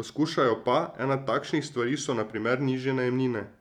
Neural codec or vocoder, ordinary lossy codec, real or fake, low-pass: vocoder, 44.1 kHz, 128 mel bands every 256 samples, BigVGAN v2; none; fake; 19.8 kHz